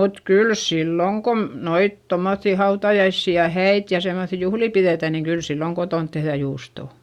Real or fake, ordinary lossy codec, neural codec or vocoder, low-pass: real; Opus, 64 kbps; none; 19.8 kHz